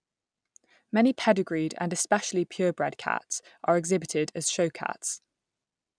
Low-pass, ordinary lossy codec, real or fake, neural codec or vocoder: 9.9 kHz; none; real; none